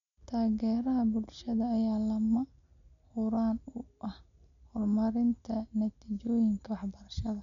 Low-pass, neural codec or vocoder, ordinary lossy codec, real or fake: 7.2 kHz; none; MP3, 96 kbps; real